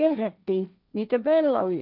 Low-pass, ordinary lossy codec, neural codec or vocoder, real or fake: 5.4 kHz; none; codec, 16 kHz, 1.1 kbps, Voila-Tokenizer; fake